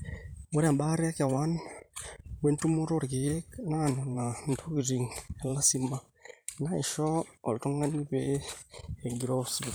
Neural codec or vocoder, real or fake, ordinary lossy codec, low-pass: vocoder, 44.1 kHz, 128 mel bands every 512 samples, BigVGAN v2; fake; none; none